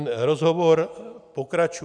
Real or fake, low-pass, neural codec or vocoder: real; 9.9 kHz; none